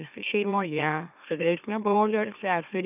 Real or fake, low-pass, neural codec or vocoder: fake; 3.6 kHz; autoencoder, 44.1 kHz, a latent of 192 numbers a frame, MeloTTS